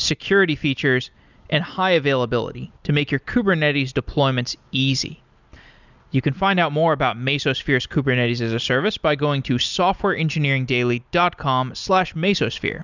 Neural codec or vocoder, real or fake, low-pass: none; real; 7.2 kHz